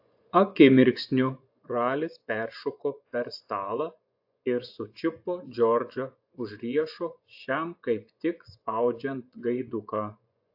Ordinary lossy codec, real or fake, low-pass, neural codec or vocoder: AAC, 48 kbps; fake; 5.4 kHz; vocoder, 24 kHz, 100 mel bands, Vocos